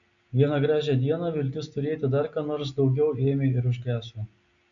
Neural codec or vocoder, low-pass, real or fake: none; 7.2 kHz; real